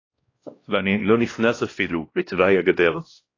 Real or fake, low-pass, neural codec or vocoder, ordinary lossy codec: fake; 7.2 kHz; codec, 16 kHz, 1 kbps, X-Codec, WavLM features, trained on Multilingual LibriSpeech; AAC, 32 kbps